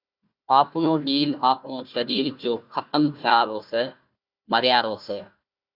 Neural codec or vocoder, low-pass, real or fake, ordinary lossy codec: codec, 16 kHz, 1 kbps, FunCodec, trained on Chinese and English, 50 frames a second; 5.4 kHz; fake; Opus, 64 kbps